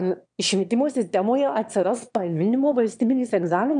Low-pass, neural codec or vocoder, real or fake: 9.9 kHz; autoencoder, 22.05 kHz, a latent of 192 numbers a frame, VITS, trained on one speaker; fake